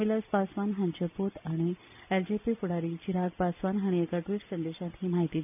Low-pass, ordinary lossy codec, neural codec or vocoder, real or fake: 3.6 kHz; none; none; real